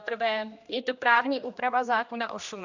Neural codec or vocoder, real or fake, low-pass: codec, 16 kHz, 1 kbps, X-Codec, HuBERT features, trained on general audio; fake; 7.2 kHz